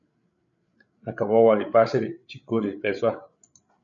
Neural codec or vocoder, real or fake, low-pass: codec, 16 kHz, 8 kbps, FreqCodec, larger model; fake; 7.2 kHz